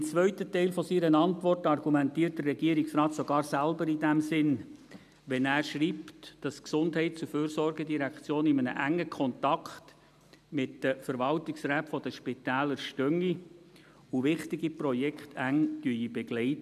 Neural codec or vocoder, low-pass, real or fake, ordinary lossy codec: none; 14.4 kHz; real; none